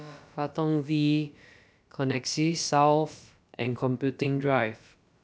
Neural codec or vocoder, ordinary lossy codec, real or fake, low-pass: codec, 16 kHz, about 1 kbps, DyCAST, with the encoder's durations; none; fake; none